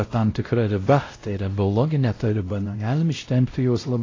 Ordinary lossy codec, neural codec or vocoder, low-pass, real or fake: AAC, 32 kbps; codec, 16 kHz, 0.5 kbps, X-Codec, WavLM features, trained on Multilingual LibriSpeech; 7.2 kHz; fake